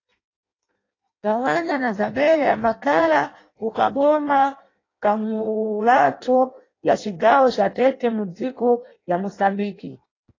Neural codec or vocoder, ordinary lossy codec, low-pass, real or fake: codec, 16 kHz in and 24 kHz out, 0.6 kbps, FireRedTTS-2 codec; AAC, 32 kbps; 7.2 kHz; fake